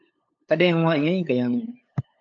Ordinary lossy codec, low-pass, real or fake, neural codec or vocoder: AAC, 64 kbps; 7.2 kHz; fake; codec, 16 kHz, 8 kbps, FunCodec, trained on LibriTTS, 25 frames a second